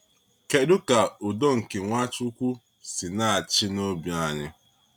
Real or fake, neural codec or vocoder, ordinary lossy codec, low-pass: real; none; none; none